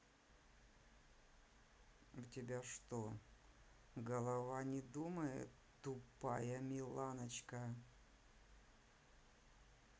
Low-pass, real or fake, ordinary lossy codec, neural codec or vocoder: none; real; none; none